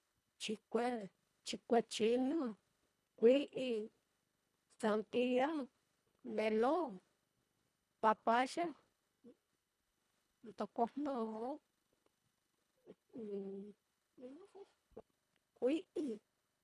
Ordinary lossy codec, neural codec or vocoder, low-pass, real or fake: none; codec, 24 kHz, 1.5 kbps, HILCodec; none; fake